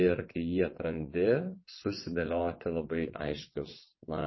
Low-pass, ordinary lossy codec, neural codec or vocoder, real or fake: 7.2 kHz; MP3, 24 kbps; vocoder, 22.05 kHz, 80 mel bands, WaveNeXt; fake